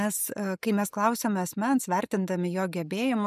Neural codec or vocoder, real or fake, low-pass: none; real; 14.4 kHz